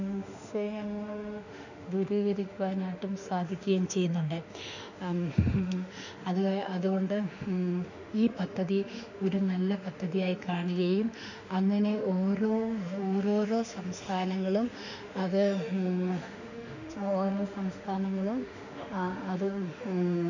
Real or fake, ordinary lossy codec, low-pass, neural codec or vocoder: fake; none; 7.2 kHz; autoencoder, 48 kHz, 32 numbers a frame, DAC-VAE, trained on Japanese speech